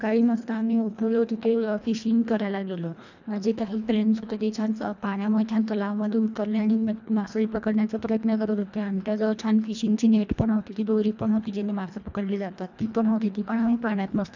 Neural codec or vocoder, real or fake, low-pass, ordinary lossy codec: codec, 24 kHz, 1.5 kbps, HILCodec; fake; 7.2 kHz; none